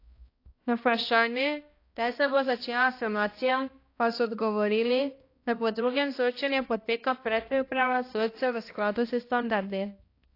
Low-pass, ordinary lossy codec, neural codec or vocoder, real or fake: 5.4 kHz; AAC, 32 kbps; codec, 16 kHz, 1 kbps, X-Codec, HuBERT features, trained on balanced general audio; fake